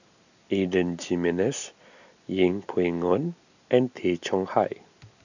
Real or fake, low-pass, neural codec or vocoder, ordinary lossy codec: real; 7.2 kHz; none; none